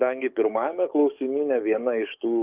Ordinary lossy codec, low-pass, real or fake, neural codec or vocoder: Opus, 24 kbps; 3.6 kHz; fake; codec, 44.1 kHz, 7.8 kbps, DAC